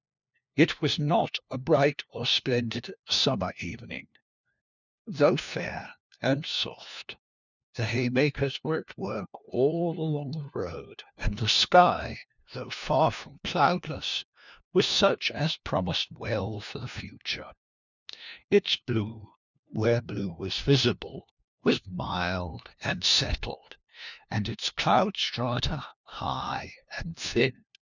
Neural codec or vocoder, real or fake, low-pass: codec, 16 kHz, 1 kbps, FunCodec, trained on LibriTTS, 50 frames a second; fake; 7.2 kHz